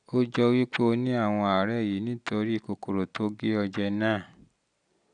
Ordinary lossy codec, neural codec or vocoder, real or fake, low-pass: none; none; real; 9.9 kHz